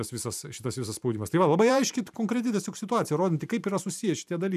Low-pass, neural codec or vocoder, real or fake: 14.4 kHz; vocoder, 44.1 kHz, 128 mel bands every 512 samples, BigVGAN v2; fake